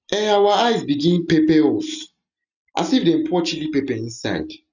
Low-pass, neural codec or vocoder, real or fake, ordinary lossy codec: 7.2 kHz; none; real; none